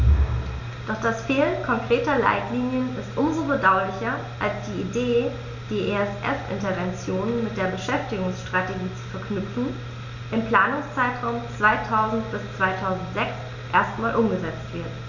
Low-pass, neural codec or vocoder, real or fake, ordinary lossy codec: 7.2 kHz; none; real; none